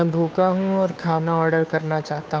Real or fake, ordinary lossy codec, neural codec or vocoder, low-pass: fake; none; codec, 16 kHz, 2 kbps, FunCodec, trained on Chinese and English, 25 frames a second; none